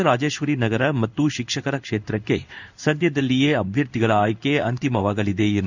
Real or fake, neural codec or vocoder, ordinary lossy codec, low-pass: fake; codec, 16 kHz in and 24 kHz out, 1 kbps, XY-Tokenizer; none; 7.2 kHz